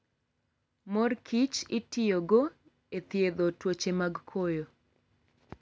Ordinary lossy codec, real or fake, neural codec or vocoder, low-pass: none; real; none; none